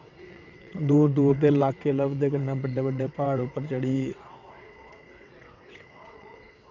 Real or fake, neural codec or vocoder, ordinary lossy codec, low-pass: fake; vocoder, 22.05 kHz, 80 mel bands, WaveNeXt; none; 7.2 kHz